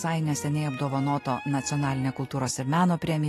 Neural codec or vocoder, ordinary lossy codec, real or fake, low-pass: none; AAC, 48 kbps; real; 14.4 kHz